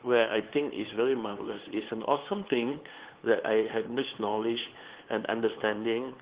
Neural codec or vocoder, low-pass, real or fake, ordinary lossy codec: codec, 16 kHz, 2 kbps, FunCodec, trained on LibriTTS, 25 frames a second; 3.6 kHz; fake; Opus, 16 kbps